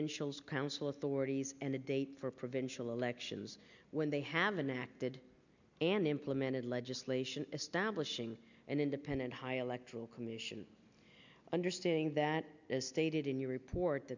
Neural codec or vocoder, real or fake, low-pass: none; real; 7.2 kHz